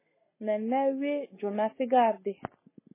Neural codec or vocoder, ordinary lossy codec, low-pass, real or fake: none; MP3, 16 kbps; 3.6 kHz; real